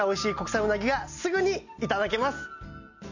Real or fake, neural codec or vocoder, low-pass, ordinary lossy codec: real; none; 7.2 kHz; none